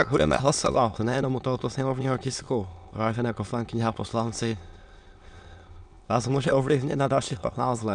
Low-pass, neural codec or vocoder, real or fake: 9.9 kHz; autoencoder, 22.05 kHz, a latent of 192 numbers a frame, VITS, trained on many speakers; fake